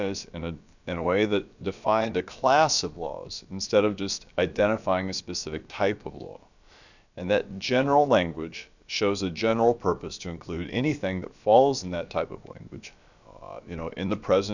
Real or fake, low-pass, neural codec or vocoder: fake; 7.2 kHz; codec, 16 kHz, about 1 kbps, DyCAST, with the encoder's durations